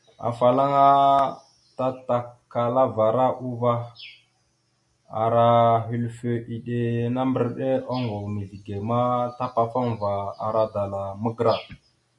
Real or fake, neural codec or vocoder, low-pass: real; none; 10.8 kHz